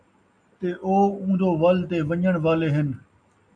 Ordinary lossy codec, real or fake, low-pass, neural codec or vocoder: AAC, 64 kbps; real; 9.9 kHz; none